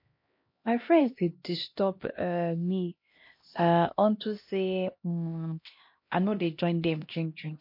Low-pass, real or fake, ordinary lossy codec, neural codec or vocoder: 5.4 kHz; fake; MP3, 32 kbps; codec, 16 kHz, 1 kbps, X-Codec, HuBERT features, trained on LibriSpeech